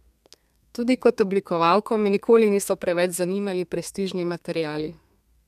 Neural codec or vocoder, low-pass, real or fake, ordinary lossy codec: codec, 32 kHz, 1.9 kbps, SNAC; 14.4 kHz; fake; none